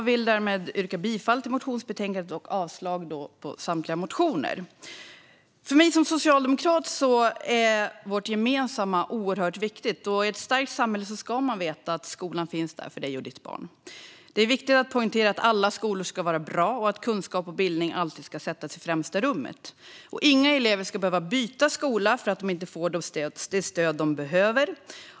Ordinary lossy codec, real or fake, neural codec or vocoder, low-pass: none; real; none; none